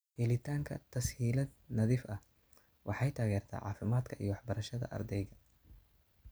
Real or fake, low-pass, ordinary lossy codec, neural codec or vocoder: real; none; none; none